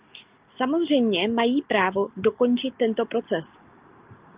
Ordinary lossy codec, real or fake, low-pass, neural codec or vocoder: Opus, 32 kbps; real; 3.6 kHz; none